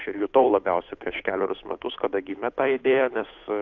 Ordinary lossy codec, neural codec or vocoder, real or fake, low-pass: AAC, 48 kbps; codec, 16 kHz, 8 kbps, FunCodec, trained on Chinese and English, 25 frames a second; fake; 7.2 kHz